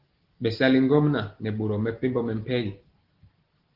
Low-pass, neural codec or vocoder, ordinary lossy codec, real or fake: 5.4 kHz; none; Opus, 16 kbps; real